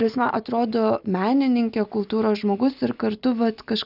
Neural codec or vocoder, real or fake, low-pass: none; real; 5.4 kHz